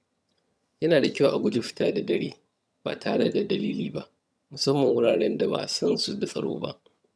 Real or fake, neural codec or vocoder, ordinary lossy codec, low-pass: fake; vocoder, 22.05 kHz, 80 mel bands, HiFi-GAN; none; none